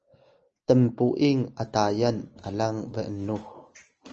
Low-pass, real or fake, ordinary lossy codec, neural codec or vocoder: 7.2 kHz; real; Opus, 24 kbps; none